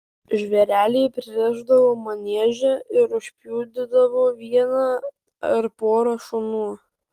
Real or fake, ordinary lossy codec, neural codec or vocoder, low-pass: real; Opus, 24 kbps; none; 14.4 kHz